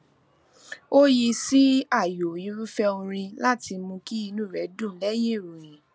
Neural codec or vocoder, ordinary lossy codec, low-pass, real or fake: none; none; none; real